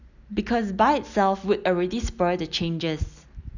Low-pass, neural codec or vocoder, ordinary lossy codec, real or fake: 7.2 kHz; none; none; real